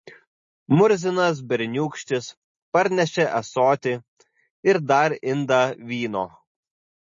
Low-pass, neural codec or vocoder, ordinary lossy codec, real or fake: 7.2 kHz; none; MP3, 32 kbps; real